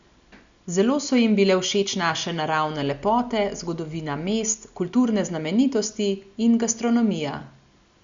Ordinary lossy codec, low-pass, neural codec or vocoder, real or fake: Opus, 64 kbps; 7.2 kHz; none; real